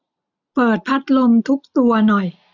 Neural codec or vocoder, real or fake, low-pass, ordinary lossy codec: none; real; 7.2 kHz; none